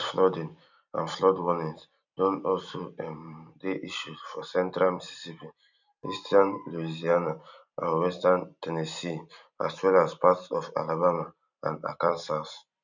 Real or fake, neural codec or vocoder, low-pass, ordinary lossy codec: fake; vocoder, 44.1 kHz, 128 mel bands every 256 samples, BigVGAN v2; 7.2 kHz; none